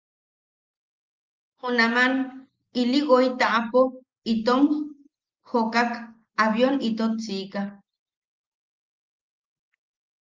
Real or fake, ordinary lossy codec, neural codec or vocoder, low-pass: real; Opus, 32 kbps; none; 7.2 kHz